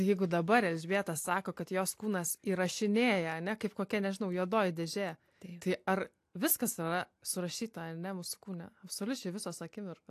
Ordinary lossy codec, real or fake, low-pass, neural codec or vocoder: AAC, 64 kbps; real; 14.4 kHz; none